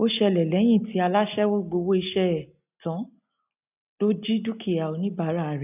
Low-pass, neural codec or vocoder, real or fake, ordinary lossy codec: 3.6 kHz; none; real; none